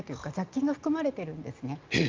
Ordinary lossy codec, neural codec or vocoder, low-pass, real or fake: Opus, 32 kbps; none; 7.2 kHz; real